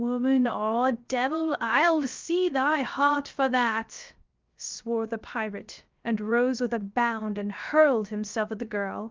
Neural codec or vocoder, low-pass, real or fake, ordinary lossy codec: codec, 16 kHz, 0.3 kbps, FocalCodec; 7.2 kHz; fake; Opus, 24 kbps